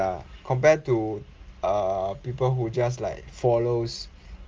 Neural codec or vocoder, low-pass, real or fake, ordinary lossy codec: none; 7.2 kHz; real; Opus, 32 kbps